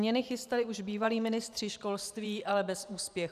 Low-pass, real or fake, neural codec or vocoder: 14.4 kHz; fake; vocoder, 44.1 kHz, 128 mel bands every 512 samples, BigVGAN v2